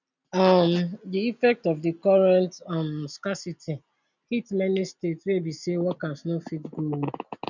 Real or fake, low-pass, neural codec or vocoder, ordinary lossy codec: real; 7.2 kHz; none; none